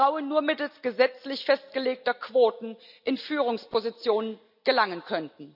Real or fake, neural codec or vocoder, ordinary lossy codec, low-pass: real; none; none; 5.4 kHz